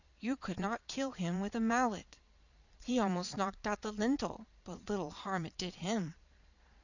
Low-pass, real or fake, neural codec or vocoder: 7.2 kHz; fake; vocoder, 22.05 kHz, 80 mel bands, Vocos